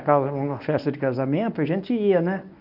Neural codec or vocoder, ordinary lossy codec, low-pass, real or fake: codec, 24 kHz, 0.9 kbps, WavTokenizer, medium speech release version 1; none; 5.4 kHz; fake